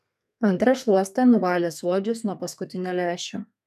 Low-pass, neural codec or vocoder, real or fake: 14.4 kHz; codec, 32 kHz, 1.9 kbps, SNAC; fake